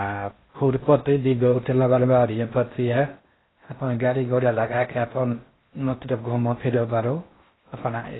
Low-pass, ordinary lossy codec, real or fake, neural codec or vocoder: 7.2 kHz; AAC, 16 kbps; fake; codec, 16 kHz in and 24 kHz out, 0.6 kbps, FocalCodec, streaming, 4096 codes